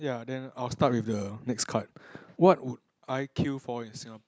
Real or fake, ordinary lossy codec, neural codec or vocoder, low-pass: real; none; none; none